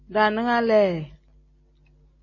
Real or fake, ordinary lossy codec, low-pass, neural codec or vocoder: real; MP3, 32 kbps; 7.2 kHz; none